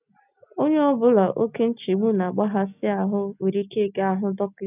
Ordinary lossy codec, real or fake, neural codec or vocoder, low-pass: none; real; none; 3.6 kHz